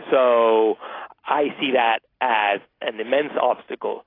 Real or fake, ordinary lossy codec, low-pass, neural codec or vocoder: real; AAC, 24 kbps; 5.4 kHz; none